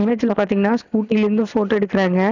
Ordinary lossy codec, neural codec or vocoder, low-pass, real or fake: none; none; 7.2 kHz; real